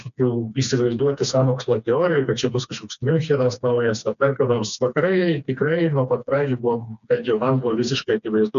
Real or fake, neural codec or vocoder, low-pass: fake; codec, 16 kHz, 2 kbps, FreqCodec, smaller model; 7.2 kHz